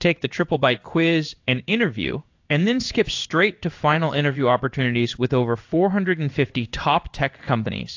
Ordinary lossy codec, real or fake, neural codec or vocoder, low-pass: AAC, 48 kbps; fake; codec, 16 kHz in and 24 kHz out, 1 kbps, XY-Tokenizer; 7.2 kHz